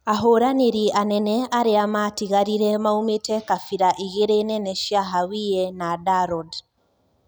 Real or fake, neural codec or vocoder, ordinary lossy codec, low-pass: real; none; none; none